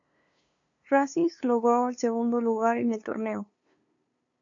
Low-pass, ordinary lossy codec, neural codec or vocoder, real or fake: 7.2 kHz; AAC, 64 kbps; codec, 16 kHz, 2 kbps, FunCodec, trained on LibriTTS, 25 frames a second; fake